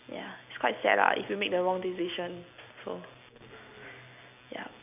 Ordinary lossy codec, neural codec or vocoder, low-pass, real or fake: none; none; 3.6 kHz; real